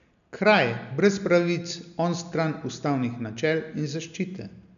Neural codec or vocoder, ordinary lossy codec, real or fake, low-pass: none; none; real; 7.2 kHz